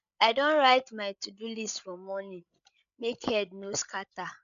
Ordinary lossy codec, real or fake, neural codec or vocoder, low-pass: none; fake; codec, 16 kHz, 16 kbps, FreqCodec, larger model; 7.2 kHz